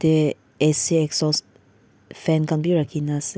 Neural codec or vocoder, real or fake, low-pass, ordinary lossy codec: none; real; none; none